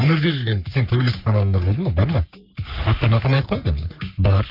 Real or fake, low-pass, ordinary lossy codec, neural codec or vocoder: fake; 5.4 kHz; none; codec, 44.1 kHz, 3.4 kbps, Pupu-Codec